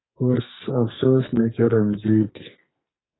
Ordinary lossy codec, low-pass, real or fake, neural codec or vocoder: AAC, 16 kbps; 7.2 kHz; fake; codec, 44.1 kHz, 2.6 kbps, SNAC